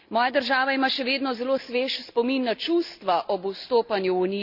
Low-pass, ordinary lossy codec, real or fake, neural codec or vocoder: 5.4 kHz; Opus, 64 kbps; real; none